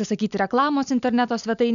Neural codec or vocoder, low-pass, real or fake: none; 7.2 kHz; real